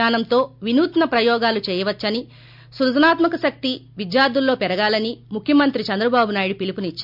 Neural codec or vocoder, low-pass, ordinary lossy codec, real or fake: none; 5.4 kHz; MP3, 48 kbps; real